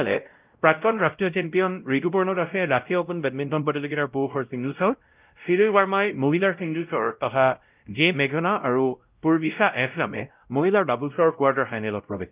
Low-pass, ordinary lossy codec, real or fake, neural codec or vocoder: 3.6 kHz; Opus, 24 kbps; fake; codec, 16 kHz, 0.5 kbps, X-Codec, WavLM features, trained on Multilingual LibriSpeech